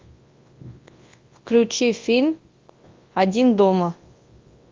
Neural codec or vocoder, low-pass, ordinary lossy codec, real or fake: codec, 24 kHz, 0.9 kbps, WavTokenizer, large speech release; 7.2 kHz; Opus, 24 kbps; fake